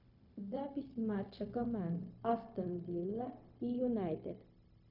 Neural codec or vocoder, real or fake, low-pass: codec, 16 kHz, 0.4 kbps, LongCat-Audio-Codec; fake; 5.4 kHz